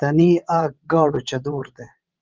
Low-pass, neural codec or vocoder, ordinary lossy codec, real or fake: 7.2 kHz; vocoder, 22.05 kHz, 80 mel bands, WaveNeXt; Opus, 24 kbps; fake